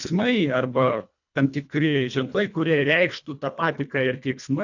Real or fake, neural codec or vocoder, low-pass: fake; codec, 24 kHz, 1.5 kbps, HILCodec; 7.2 kHz